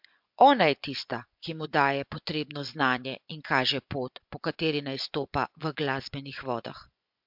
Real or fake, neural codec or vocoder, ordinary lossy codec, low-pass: fake; vocoder, 24 kHz, 100 mel bands, Vocos; MP3, 48 kbps; 5.4 kHz